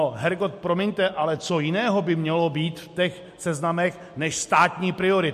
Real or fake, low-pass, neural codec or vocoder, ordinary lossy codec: real; 14.4 kHz; none; MP3, 64 kbps